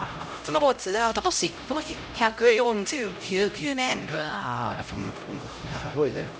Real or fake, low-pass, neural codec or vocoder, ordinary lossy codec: fake; none; codec, 16 kHz, 0.5 kbps, X-Codec, HuBERT features, trained on LibriSpeech; none